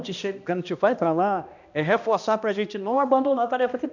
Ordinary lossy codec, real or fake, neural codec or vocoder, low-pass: none; fake; codec, 16 kHz, 1 kbps, X-Codec, HuBERT features, trained on balanced general audio; 7.2 kHz